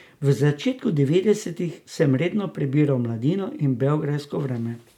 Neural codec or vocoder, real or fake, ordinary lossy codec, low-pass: none; real; MP3, 96 kbps; 19.8 kHz